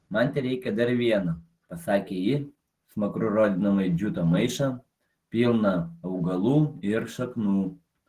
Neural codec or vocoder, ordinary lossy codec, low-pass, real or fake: none; Opus, 16 kbps; 14.4 kHz; real